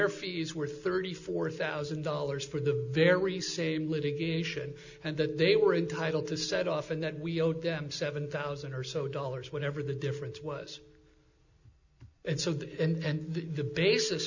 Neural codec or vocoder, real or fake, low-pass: none; real; 7.2 kHz